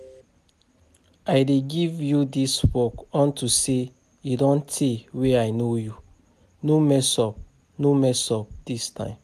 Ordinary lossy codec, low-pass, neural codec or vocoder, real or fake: none; 14.4 kHz; none; real